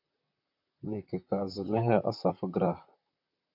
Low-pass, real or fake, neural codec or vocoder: 5.4 kHz; fake; vocoder, 44.1 kHz, 128 mel bands, Pupu-Vocoder